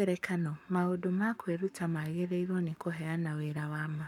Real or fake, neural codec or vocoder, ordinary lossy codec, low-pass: fake; codec, 44.1 kHz, 7.8 kbps, Pupu-Codec; MP3, 96 kbps; 19.8 kHz